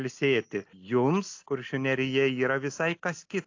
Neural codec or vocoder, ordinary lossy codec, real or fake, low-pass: none; AAC, 48 kbps; real; 7.2 kHz